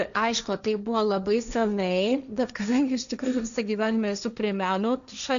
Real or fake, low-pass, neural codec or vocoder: fake; 7.2 kHz; codec, 16 kHz, 1.1 kbps, Voila-Tokenizer